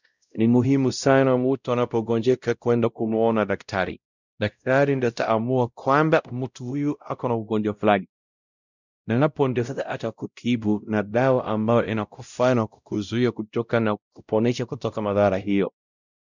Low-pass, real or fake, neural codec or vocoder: 7.2 kHz; fake; codec, 16 kHz, 0.5 kbps, X-Codec, WavLM features, trained on Multilingual LibriSpeech